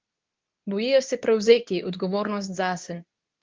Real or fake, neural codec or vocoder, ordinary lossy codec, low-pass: fake; codec, 24 kHz, 0.9 kbps, WavTokenizer, medium speech release version 2; Opus, 32 kbps; 7.2 kHz